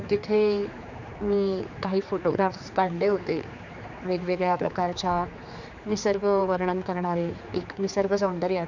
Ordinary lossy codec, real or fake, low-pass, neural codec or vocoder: none; fake; 7.2 kHz; codec, 16 kHz, 4 kbps, X-Codec, HuBERT features, trained on general audio